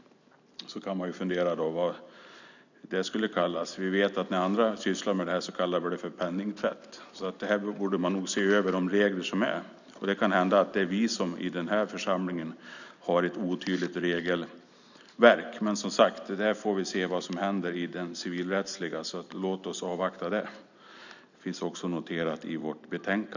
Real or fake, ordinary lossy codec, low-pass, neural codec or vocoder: real; none; 7.2 kHz; none